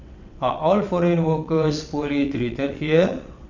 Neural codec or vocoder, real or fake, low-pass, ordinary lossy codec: vocoder, 22.05 kHz, 80 mel bands, WaveNeXt; fake; 7.2 kHz; none